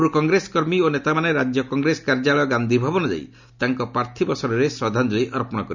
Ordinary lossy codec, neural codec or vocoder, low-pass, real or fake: none; none; 7.2 kHz; real